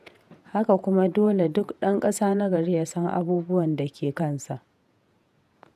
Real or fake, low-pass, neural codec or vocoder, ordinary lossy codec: fake; 14.4 kHz; vocoder, 48 kHz, 128 mel bands, Vocos; none